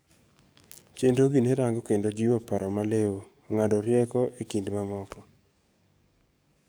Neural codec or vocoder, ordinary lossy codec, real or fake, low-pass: codec, 44.1 kHz, 7.8 kbps, DAC; none; fake; none